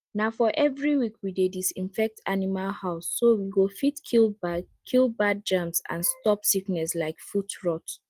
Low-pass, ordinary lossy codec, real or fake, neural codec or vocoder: 14.4 kHz; Opus, 24 kbps; real; none